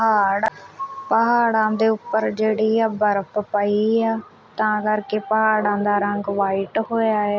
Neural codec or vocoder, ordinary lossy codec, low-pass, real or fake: none; none; none; real